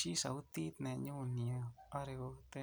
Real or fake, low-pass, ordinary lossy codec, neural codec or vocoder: real; none; none; none